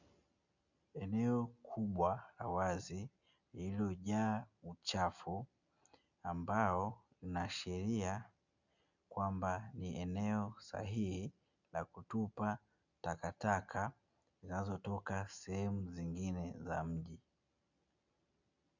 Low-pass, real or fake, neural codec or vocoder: 7.2 kHz; real; none